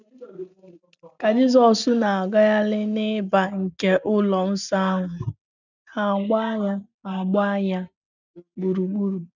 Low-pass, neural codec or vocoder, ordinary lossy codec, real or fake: 7.2 kHz; none; none; real